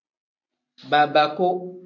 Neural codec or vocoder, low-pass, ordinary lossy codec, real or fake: none; 7.2 kHz; AAC, 48 kbps; real